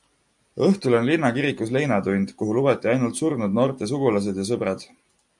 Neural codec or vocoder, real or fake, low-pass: none; real; 10.8 kHz